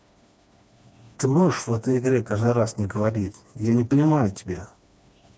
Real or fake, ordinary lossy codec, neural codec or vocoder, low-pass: fake; none; codec, 16 kHz, 2 kbps, FreqCodec, smaller model; none